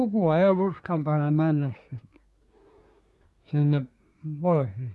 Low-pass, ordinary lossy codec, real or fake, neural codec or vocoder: none; none; fake; codec, 24 kHz, 1 kbps, SNAC